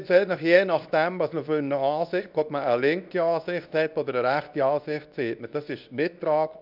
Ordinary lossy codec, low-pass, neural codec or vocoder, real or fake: none; 5.4 kHz; codec, 24 kHz, 0.9 kbps, WavTokenizer, medium speech release version 2; fake